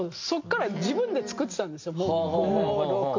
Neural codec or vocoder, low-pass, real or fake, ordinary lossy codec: none; 7.2 kHz; real; MP3, 48 kbps